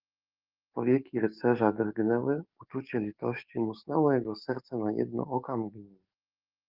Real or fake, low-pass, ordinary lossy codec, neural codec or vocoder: fake; 5.4 kHz; Opus, 32 kbps; codec, 44.1 kHz, 7.8 kbps, DAC